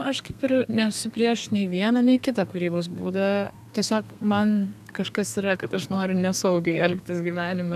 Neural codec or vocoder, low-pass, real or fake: codec, 32 kHz, 1.9 kbps, SNAC; 14.4 kHz; fake